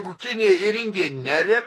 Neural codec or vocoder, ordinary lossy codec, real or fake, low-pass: autoencoder, 48 kHz, 32 numbers a frame, DAC-VAE, trained on Japanese speech; AAC, 48 kbps; fake; 14.4 kHz